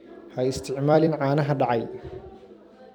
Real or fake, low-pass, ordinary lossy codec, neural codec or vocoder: fake; 19.8 kHz; none; vocoder, 44.1 kHz, 128 mel bands every 256 samples, BigVGAN v2